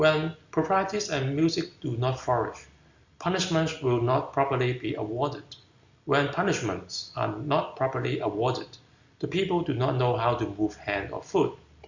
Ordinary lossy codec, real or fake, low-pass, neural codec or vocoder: Opus, 64 kbps; real; 7.2 kHz; none